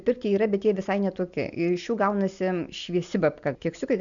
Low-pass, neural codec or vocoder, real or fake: 7.2 kHz; none; real